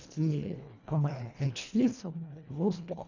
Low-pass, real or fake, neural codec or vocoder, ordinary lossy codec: 7.2 kHz; fake; codec, 24 kHz, 1.5 kbps, HILCodec; none